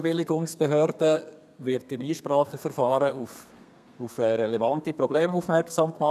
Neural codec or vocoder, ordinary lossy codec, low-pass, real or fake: codec, 32 kHz, 1.9 kbps, SNAC; none; 14.4 kHz; fake